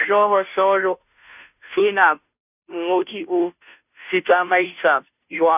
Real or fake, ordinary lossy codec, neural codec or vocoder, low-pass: fake; none; codec, 16 kHz, 0.5 kbps, FunCodec, trained on Chinese and English, 25 frames a second; 3.6 kHz